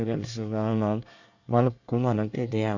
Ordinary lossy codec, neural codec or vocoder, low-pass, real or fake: none; codec, 24 kHz, 1 kbps, SNAC; 7.2 kHz; fake